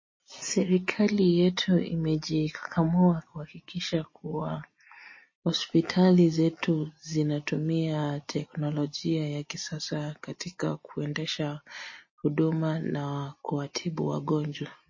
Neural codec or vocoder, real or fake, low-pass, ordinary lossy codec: none; real; 7.2 kHz; MP3, 32 kbps